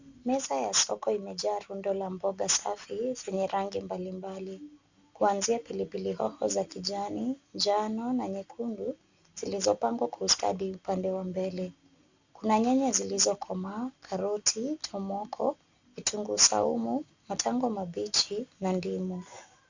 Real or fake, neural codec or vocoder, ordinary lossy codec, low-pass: real; none; Opus, 64 kbps; 7.2 kHz